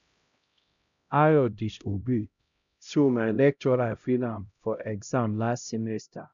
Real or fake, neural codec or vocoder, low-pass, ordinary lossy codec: fake; codec, 16 kHz, 0.5 kbps, X-Codec, HuBERT features, trained on LibriSpeech; 7.2 kHz; none